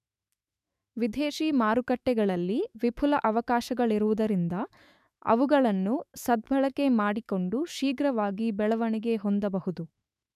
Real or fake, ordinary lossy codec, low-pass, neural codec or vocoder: fake; none; 14.4 kHz; autoencoder, 48 kHz, 128 numbers a frame, DAC-VAE, trained on Japanese speech